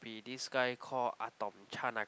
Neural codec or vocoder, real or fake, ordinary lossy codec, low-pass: none; real; none; none